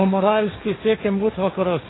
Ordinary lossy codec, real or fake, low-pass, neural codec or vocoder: AAC, 16 kbps; fake; 7.2 kHz; codec, 16 kHz, 1.1 kbps, Voila-Tokenizer